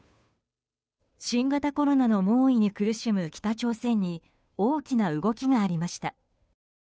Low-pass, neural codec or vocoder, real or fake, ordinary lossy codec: none; codec, 16 kHz, 2 kbps, FunCodec, trained on Chinese and English, 25 frames a second; fake; none